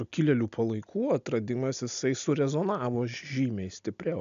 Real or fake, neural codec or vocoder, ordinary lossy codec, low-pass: real; none; AAC, 96 kbps; 7.2 kHz